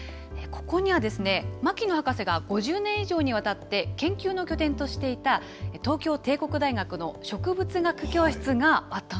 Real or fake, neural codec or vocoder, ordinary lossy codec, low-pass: real; none; none; none